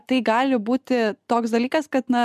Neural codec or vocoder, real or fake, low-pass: none; real; 14.4 kHz